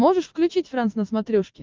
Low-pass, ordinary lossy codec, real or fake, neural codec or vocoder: 7.2 kHz; Opus, 32 kbps; real; none